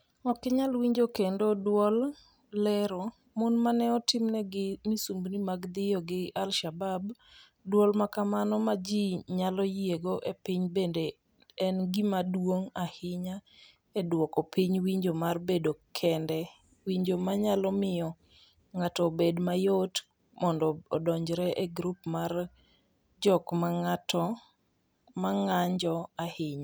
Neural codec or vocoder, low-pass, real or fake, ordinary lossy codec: none; none; real; none